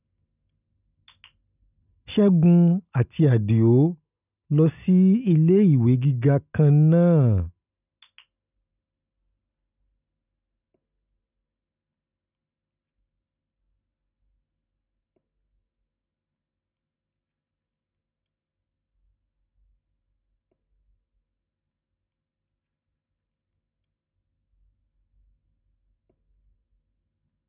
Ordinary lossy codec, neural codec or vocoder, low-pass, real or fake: none; none; 3.6 kHz; real